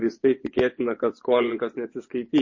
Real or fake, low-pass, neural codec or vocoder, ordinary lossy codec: fake; 7.2 kHz; vocoder, 24 kHz, 100 mel bands, Vocos; MP3, 32 kbps